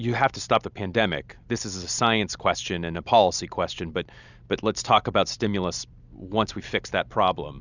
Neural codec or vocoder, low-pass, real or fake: none; 7.2 kHz; real